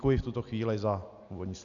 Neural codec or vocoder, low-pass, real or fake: none; 7.2 kHz; real